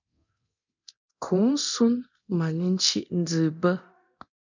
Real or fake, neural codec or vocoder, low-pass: fake; codec, 24 kHz, 0.9 kbps, DualCodec; 7.2 kHz